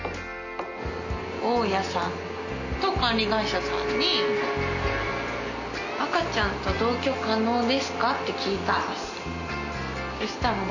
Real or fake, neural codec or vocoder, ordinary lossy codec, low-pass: real; none; none; 7.2 kHz